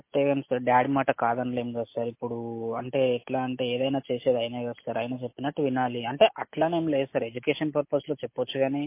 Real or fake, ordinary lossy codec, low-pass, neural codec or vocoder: real; MP3, 24 kbps; 3.6 kHz; none